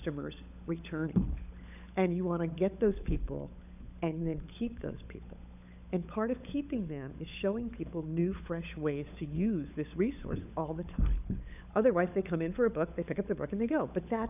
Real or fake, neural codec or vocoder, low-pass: fake; codec, 16 kHz, 16 kbps, FunCodec, trained on LibriTTS, 50 frames a second; 3.6 kHz